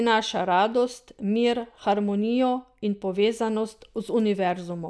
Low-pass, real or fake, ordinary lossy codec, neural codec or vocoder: none; real; none; none